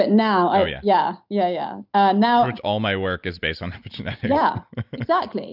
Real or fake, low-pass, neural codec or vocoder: real; 5.4 kHz; none